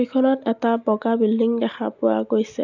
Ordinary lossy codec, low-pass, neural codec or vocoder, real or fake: none; 7.2 kHz; none; real